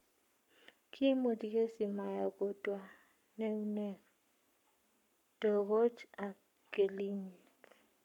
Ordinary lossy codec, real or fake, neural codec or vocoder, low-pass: none; fake; codec, 44.1 kHz, 7.8 kbps, Pupu-Codec; 19.8 kHz